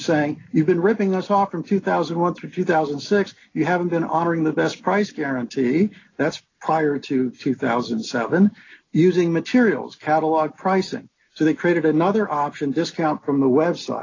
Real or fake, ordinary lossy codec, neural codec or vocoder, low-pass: real; AAC, 32 kbps; none; 7.2 kHz